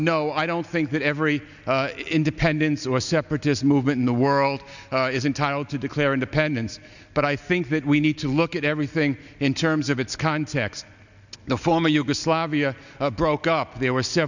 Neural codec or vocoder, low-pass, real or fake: none; 7.2 kHz; real